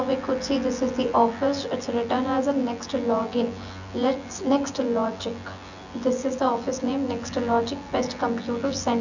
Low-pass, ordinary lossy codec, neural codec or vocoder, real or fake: 7.2 kHz; none; vocoder, 24 kHz, 100 mel bands, Vocos; fake